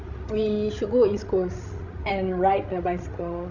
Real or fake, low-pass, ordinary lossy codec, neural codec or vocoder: fake; 7.2 kHz; none; codec, 16 kHz, 16 kbps, FreqCodec, larger model